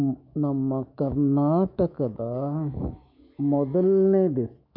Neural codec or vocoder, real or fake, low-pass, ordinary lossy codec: autoencoder, 48 kHz, 128 numbers a frame, DAC-VAE, trained on Japanese speech; fake; 5.4 kHz; none